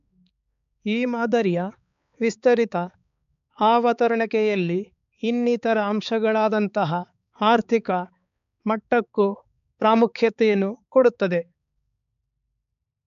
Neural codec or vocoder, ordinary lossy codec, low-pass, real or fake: codec, 16 kHz, 4 kbps, X-Codec, HuBERT features, trained on balanced general audio; none; 7.2 kHz; fake